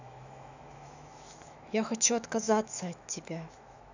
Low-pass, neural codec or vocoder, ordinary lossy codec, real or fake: 7.2 kHz; autoencoder, 48 kHz, 128 numbers a frame, DAC-VAE, trained on Japanese speech; none; fake